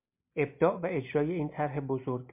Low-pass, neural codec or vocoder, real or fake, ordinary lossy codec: 3.6 kHz; none; real; MP3, 32 kbps